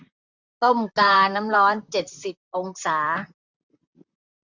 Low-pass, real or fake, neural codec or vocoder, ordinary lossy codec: 7.2 kHz; fake; vocoder, 44.1 kHz, 128 mel bands, Pupu-Vocoder; Opus, 64 kbps